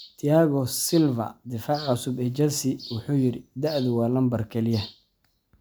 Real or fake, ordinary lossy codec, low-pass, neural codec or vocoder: real; none; none; none